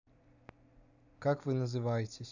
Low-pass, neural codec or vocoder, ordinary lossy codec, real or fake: 7.2 kHz; none; none; real